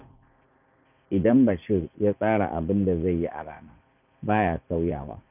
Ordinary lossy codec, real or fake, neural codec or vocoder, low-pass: none; fake; vocoder, 44.1 kHz, 80 mel bands, Vocos; 3.6 kHz